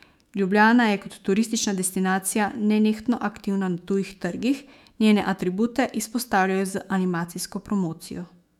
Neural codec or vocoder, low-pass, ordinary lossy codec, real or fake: autoencoder, 48 kHz, 128 numbers a frame, DAC-VAE, trained on Japanese speech; 19.8 kHz; none; fake